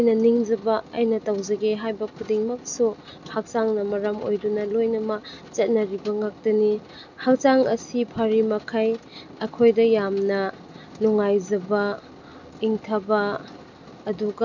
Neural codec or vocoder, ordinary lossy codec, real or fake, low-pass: none; none; real; 7.2 kHz